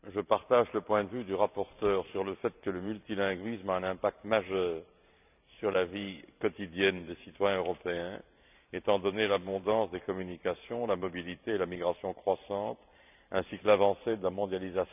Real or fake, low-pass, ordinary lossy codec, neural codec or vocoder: fake; 3.6 kHz; none; vocoder, 44.1 kHz, 128 mel bands every 512 samples, BigVGAN v2